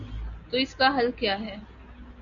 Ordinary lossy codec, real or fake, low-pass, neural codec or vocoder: MP3, 96 kbps; real; 7.2 kHz; none